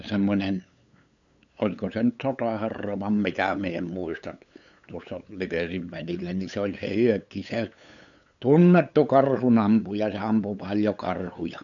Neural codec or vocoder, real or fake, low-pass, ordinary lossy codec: codec, 16 kHz, 8 kbps, FunCodec, trained on LibriTTS, 25 frames a second; fake; 7.2 kHz; none